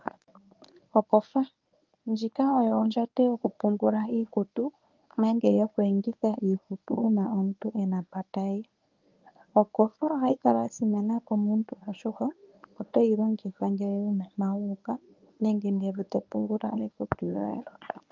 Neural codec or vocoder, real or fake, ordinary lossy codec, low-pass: codec, 24 kHz, 0.9 kbps, WavTokenizer, medium speech release version 1; fake; Opus, 24 kbps; 7.2 kHz